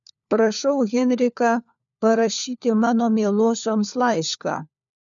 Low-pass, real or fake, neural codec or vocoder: 7.2 kHz; fake; codec, 16 kHz, 4 kbps, FunCodec, trained on LibriTTS, 50 frames a second